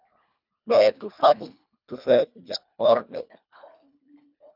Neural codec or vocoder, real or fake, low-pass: codec, 24 kHz, 1.5 kbps, HILCodec; fake; 5.4 kHz